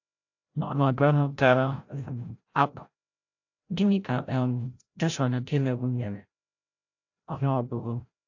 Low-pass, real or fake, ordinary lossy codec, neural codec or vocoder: 7.2 kHz; fake; AAC, 48 kbps; codec, 16 kHz, 0.5 kbps, FreqCodec, larger model